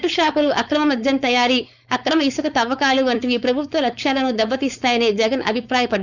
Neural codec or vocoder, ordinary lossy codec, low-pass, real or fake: codec, 16 kHz, 4.8 kbps, FACodec; none; 7.2 kHz; fake